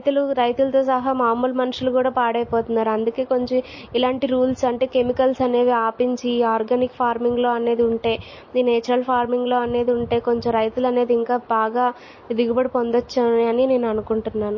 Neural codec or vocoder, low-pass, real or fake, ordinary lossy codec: none; 7.2 kHz; real; MP3, 32 kbps